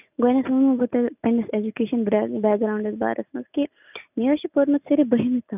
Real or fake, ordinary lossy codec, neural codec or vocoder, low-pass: real; none; none; 3.6 kHz